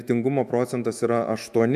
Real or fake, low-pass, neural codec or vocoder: fake; 14.4 kHz; autoencoder, 48 kHz, 128 numbers a frame, DAC-VAE, trained on Japanese speech